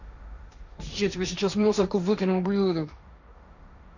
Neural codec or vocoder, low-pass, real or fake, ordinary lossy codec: codec, 16 kHz, 1.1 kbps, Voila-Tokenizer; 7.2 kHz; fake; none